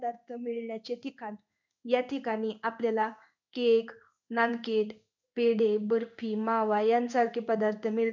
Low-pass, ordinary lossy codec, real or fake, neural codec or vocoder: 7.2 kHz; none; fake; codec, 16 kHz in and 24 kHz out, 1 kbps, XY-Tokenizer